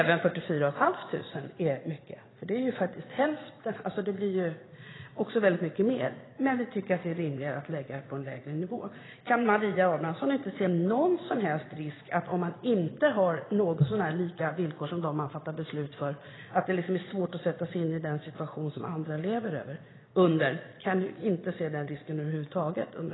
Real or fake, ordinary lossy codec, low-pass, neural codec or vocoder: fake; AAC, 16 kbps; 7.2 kHz; vocoder, 22.05 kHz, 80 mel bands, Vocos